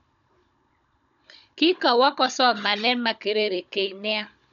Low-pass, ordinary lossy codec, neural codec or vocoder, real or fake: 7.2 kHz; none; codec, 16 kHz, 16 kbps, FunCodec, trained on Chinese and English, 50 frames a second; fake